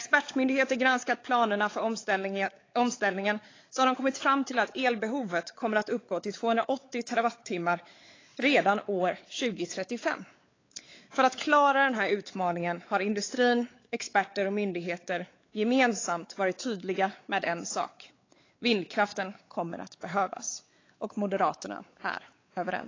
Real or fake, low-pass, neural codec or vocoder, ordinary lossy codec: fake; 7.2 kHz; codec, 16 kHz, 4 kbps, X-Codec, WavLM features, trained on Multilingual LibriSpeech; AAC, 32 kbps